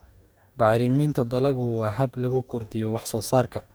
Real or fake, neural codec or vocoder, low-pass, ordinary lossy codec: fake; codec, 44.1 kHz, 2.6 kbps, DAC; none; none